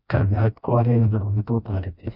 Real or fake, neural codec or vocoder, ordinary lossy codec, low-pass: fake; codec, 16 kHz, 1 kbps, FreqCodec, smaller model; Opus, 64 kbps; 5.4 kHz